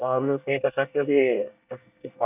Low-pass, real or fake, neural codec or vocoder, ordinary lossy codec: 3.6 kHz; fake; codec, 24 kHz, 1 kbps, SNAC; none